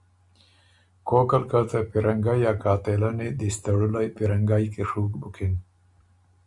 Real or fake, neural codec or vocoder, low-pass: real; none; 10.8 kHz